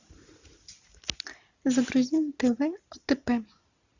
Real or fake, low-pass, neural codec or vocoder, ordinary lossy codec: real; 7.2 kHz; none; Opus, 64 kbps